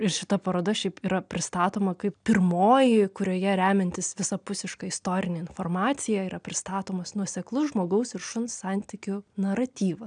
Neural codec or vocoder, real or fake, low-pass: none; real; 9.9 kHz